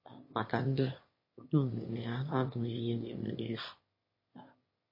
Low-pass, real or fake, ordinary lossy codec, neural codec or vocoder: 5.4 kHz; fake; MP3, 24 kbps; autoencoder, 22.05 kHz, a latent of 192 numbers a frame, VITS, trained on one speaker